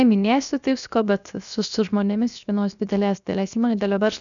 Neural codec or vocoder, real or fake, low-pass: codec, 16 kHz, 0.7 kbps, FocalCodec; fake; 7.2 kHz